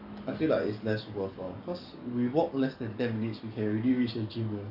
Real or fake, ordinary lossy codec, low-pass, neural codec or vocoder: fake; none; 5.4 kHz; codec, 44.1 kHz, 7.8 kbps, DAC